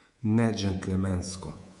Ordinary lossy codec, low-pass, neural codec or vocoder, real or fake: MP3, 64 kbps; 10.8 kHz; codec, 24 kHz, 3.1 kbps, DualCodec; fake